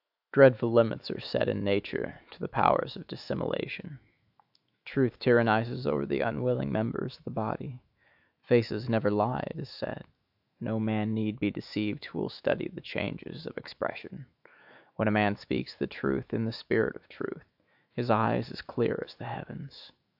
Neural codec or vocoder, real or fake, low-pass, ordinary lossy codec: autoencoder, 48 kHz, 128 numbers a frame, DAC-VAE, trained on Japanese speech; fake; 5.4 kHz; AAC, 48 kbps